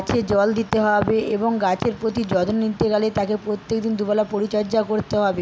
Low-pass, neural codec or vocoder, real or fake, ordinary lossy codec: none; none; real; none